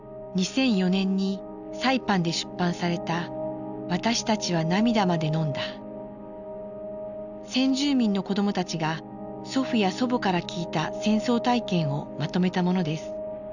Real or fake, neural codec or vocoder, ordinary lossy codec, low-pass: real; none; none; 7.2 kHz